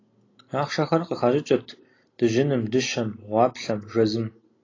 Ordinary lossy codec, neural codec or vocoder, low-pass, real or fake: AAC, 32 kbps; none; 7.2 kHz; real